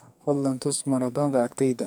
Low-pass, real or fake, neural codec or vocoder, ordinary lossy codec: none; fake; codec, 44.1 kHz, 2.6 kbps, SNAC; none